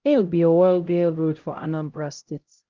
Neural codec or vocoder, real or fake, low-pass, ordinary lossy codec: codec, 16 kHz, 0.5 kbps, X-Codec, HuBERT features, trained on LibriSpeech; fake; 7.2 kHz; Opus, 32 kbps